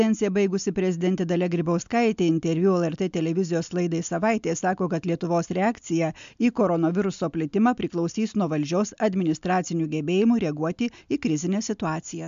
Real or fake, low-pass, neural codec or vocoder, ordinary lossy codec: real; 7.2 kHz; none; MP3, 64 kbps